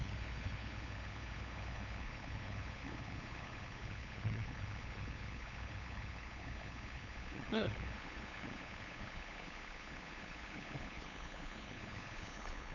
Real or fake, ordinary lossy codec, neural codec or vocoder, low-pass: fake; none; codec, 16 kHz, 8 kbps, FunCodec, trained on LibriTTS, 25 frames a second; 7.2 kHz